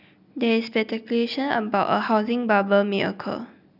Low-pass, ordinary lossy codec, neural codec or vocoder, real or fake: 5.4 kHz; none; none; real